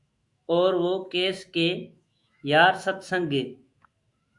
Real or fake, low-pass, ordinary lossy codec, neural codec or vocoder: fake; 10.8 kHz; Opus, 64 kbps; autoencoder, 48 kHz, 128 numbers a frame, DAC-VAE, trained on Japanese speech